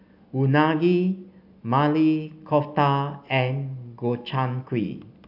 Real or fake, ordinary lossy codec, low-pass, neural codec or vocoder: real; AAC, 48 kbps; 5.4 kHz; none